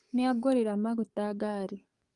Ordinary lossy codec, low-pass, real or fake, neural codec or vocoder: Opus, 32 kbps; 10.8 kHz; fake; codec, 44.1 kHz, 7.8 kbps, DAC